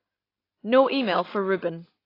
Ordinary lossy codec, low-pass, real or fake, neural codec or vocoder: AAC, 24 kbps; 5.4 kHz; real; none